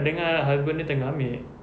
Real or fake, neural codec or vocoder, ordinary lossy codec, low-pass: real; none; none; none